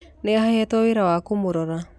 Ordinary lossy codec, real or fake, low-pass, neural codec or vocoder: none; real; none; none